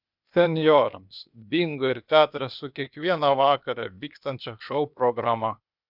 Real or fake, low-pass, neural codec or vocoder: fake; 5.4 kHz; codec, 16 kHz, 0.8 kbps, ZipCodec